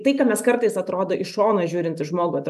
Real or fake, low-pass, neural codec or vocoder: real; 14.4 kHz; none